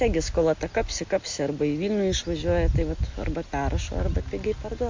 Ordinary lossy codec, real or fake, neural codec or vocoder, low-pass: MP3, 48 kbps; real; none; 7.2 kHz